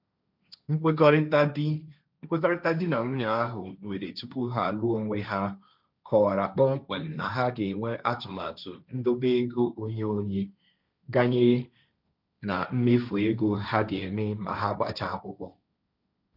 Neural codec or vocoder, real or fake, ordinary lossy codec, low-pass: codec, 16 kHz, 1.1 kbps, Voila-Tokenizer; fake; none; 5.4 kHz